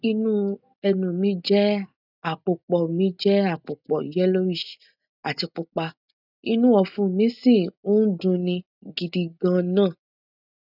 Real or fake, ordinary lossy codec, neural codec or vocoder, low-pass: real; none; none; 5.4 kHz